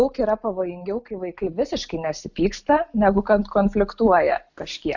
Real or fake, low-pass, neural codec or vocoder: real; 7.2 kHz; none